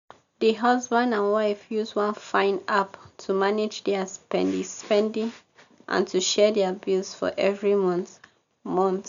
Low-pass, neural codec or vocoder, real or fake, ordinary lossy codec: 7.2 kHz; none; real; none